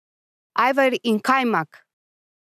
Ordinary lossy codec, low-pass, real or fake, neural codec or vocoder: none; 14.4 kHz; real; none